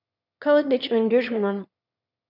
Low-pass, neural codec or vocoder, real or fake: 5.4 kHz; autoencoder, 22.05 kHz, a latent of 192 numbers a frame, VITS, trained on one speaker; fake